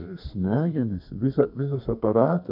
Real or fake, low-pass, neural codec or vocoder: fake; 5.4 kHz; codec, 32 kHz, 1.9 kbps, SNAC